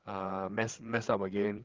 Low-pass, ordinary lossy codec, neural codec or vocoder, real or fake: 7.2 kHz; Opus, 16 kbps; codec, 16 kHz, 8 kbps, FreqCodec, larger model; fake